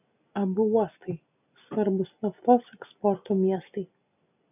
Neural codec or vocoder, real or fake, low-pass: none; real; 3.6 kHz